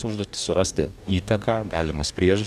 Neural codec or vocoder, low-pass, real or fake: codec, 44.1 kHz, 2.6 kbps, DAC; 14.4 kHz; fake